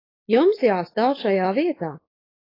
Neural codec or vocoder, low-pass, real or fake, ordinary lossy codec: codec, 16 kHz, 4.8 kbps, FACodec; 5.4 kHz; fake; AAC, 24 kbps